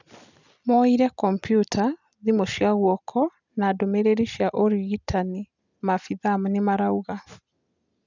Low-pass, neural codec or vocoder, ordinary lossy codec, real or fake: 7.2 kHz; none; none; real